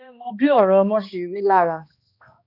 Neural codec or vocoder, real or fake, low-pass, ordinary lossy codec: codec, 16 kHz, 1 kbps, X-Codec, HuBERT features, trained on balanced general audio; fake; 5.4 kHz; none